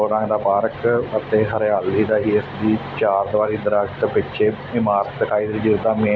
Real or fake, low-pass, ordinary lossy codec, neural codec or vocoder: real; none; none; none